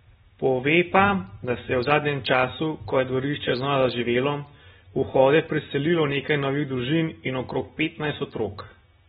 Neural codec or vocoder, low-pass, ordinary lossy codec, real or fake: none; 19.8 kHz; AAC, 16 kbps; real